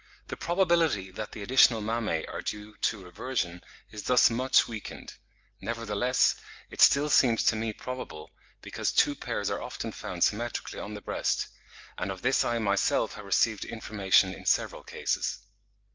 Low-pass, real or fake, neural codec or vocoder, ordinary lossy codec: 7.2 kHz; real; none; Opus, 24 kbps